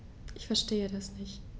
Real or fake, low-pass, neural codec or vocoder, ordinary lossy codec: real; none; none; none